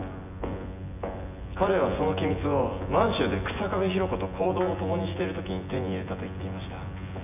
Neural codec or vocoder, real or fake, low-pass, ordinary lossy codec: vocoder, 24 kHz, 100 mel bands, Vocos; fake; 3.6 kHz; none